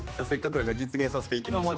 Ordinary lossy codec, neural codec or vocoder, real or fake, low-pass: none; codec, 16 kHz, 2 kbps, X-Codec, HuBERT features, trained on general audio; fake; none